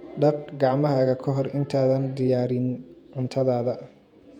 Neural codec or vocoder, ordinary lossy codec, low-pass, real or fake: vocoder, 48 kHz, 128 mel bands, Vocos; none; 19.8 kHz; fake